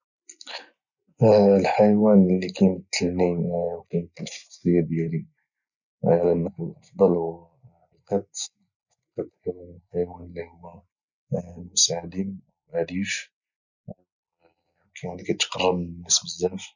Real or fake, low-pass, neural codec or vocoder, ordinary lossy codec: real; 7.2 kHz; none; none